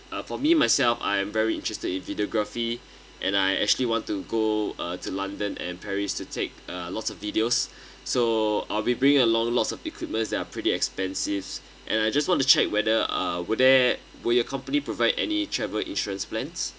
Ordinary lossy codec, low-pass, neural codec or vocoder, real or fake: none; none; none; real